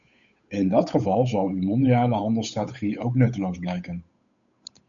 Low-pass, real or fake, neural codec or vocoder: 7.2 kHz; fake; codec, 16 kHz, 8 kbps, FunCodec, trained on Chinese and English, 25 frames a second